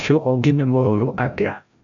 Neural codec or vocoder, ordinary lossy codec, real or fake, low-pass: codec, 16 kHz, 0.5 kbps, FreqCodec, larger model; none; fake; 7.2 kHz